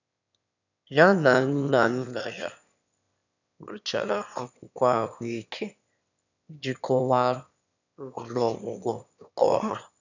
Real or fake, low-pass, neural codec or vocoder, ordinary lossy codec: fake; 7.2 kHz; autoencoder, 22.05 kHz, a latent of 192 numbers a frame, VITS, trained on one speaker; none